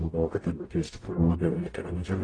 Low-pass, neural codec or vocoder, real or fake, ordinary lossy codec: 9.9 kHz; codec, 44.1 kHz, 0.9 kbps, DAC; fake; AAC, 32 kbps